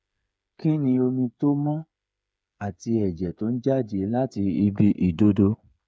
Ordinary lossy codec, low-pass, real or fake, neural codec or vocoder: none; none; fake; codec, 16 kHz, 8 kbps, FreqCodec, smaller model